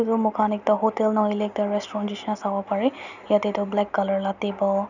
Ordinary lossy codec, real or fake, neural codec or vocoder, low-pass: none; real; none; 7.2 kHz